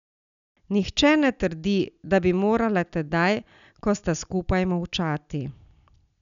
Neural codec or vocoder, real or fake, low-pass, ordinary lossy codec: none; real; 7.2 kHz; none